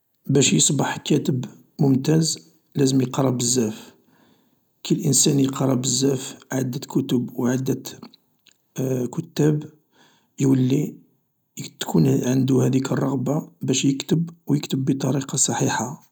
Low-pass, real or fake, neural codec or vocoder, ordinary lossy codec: none; real; none; none